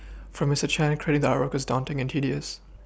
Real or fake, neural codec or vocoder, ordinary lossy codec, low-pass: real; none; none; none